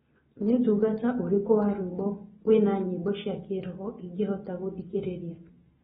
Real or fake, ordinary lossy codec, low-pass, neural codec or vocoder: real; AAC, 16 kbps; 7.2 kHz; none